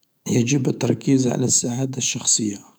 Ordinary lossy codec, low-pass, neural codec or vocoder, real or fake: none; none; autoencoder, 48 kHz, 128 numbers a frame, DAC-VAE, trained on Japanese speech; fake